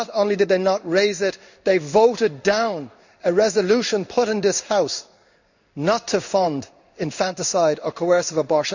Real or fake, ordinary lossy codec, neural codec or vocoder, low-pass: fake; none; codec, 16 kHz in and 24 kHz out, 1 kbps, XY-Tokenizer; 7.2 kHz